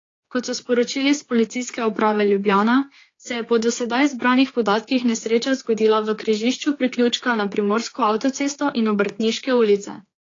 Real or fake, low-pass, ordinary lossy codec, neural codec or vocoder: fake; 7.2 kHz; AAC, 32 kbps; codec, 16 kHz, 4 kbps, X-Codec, HuBERT features, trained on general audio